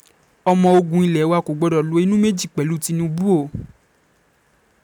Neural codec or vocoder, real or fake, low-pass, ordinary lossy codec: none; real; 19.8 kHz; none